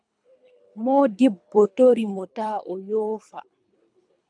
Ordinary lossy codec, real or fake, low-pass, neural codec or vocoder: MP3, 96 kbps; fake; 9.9 kHz; codec, 24 kHz, 3 kbps, HILCodec